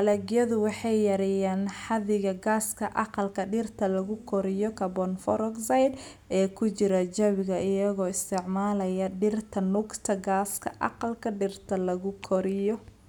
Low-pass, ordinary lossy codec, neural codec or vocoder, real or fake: 19.8 kHz; none; none; real